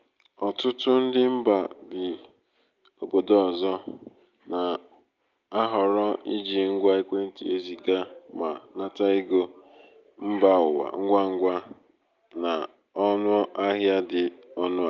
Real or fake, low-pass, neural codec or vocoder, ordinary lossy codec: real; 7.2 kHz; none; Opus, 24 kbps